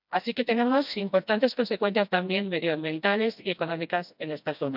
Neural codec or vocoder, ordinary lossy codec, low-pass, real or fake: codec, 16 kHz, 1 kbps, FreqCodec, smaller model; none; 5.4 kHz; fake